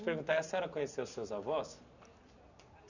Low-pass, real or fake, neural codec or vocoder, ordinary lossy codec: 7.2 kHz; real; none; none